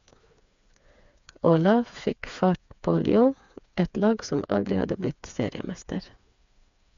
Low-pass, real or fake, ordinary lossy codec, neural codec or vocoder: 7.2 kHz; fake; MP3, 64 kbps; codec, 16 kHz, 4 kbps, FreqCodec, smaller model